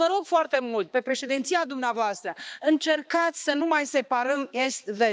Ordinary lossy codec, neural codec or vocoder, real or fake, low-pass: none; codec, 16 kHz, 2 kbps, X-Codec, HuBERT features, trained on balanced general audio; fake; none